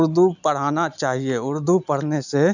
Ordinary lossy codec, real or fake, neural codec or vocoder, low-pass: none; real; none; 7.2 kHz